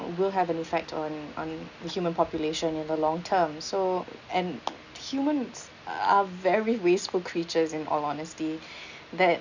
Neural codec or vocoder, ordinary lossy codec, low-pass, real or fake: none; none; 7.2 kHz; real